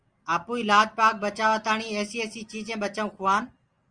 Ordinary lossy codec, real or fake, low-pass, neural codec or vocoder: Opus, 32 kbps; real; 9.9 kHz; none